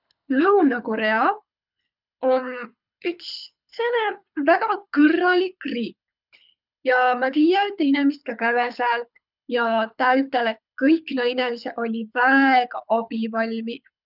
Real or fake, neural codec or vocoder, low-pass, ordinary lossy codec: fake; codec, 24 kHz, 6 kbps, HILCodec; 5.4 kHz; none